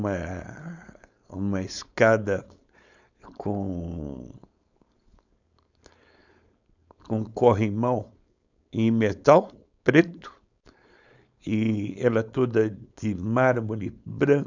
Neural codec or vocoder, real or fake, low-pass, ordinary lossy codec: codec, 16 kHz, 4.8 kbps, FACodec; fake; 7.2 kHz; none